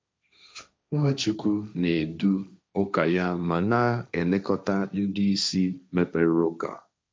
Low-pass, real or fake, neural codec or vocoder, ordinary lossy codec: none; fake; codec, 16 kHz, 1.1 kbps, Voila-Tokenizer; none